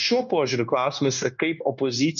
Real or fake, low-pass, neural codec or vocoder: fake; 7.2 kHz; codec, 16 kHz, 0.9 kbps, LongCat-Audio-Codec